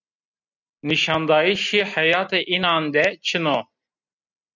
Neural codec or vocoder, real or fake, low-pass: none; real; 7.2 kHz